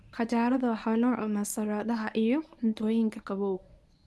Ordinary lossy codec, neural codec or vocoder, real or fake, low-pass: none; codec, 24 kHz, 0.9 kbps, WavTokenizer, medium speech release version 1; fake; none